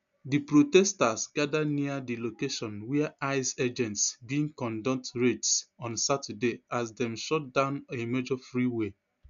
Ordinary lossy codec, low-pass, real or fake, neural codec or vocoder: none; 7.2 kHz; real; none